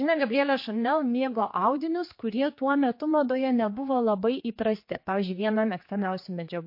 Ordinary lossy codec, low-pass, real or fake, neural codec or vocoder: MP3, 32 kbps; 5.4 kHz; fake; codec, 16 kHz, 4 kbps, X-Codec, HuBERT features, trained on general audio